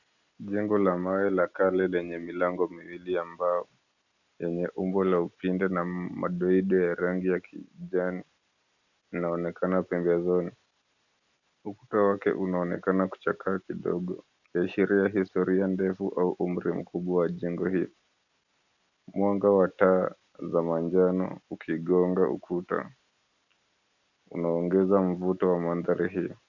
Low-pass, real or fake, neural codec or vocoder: 7.2 kHz; real; none